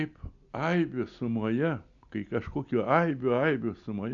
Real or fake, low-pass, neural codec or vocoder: real; 7.2 kHz; none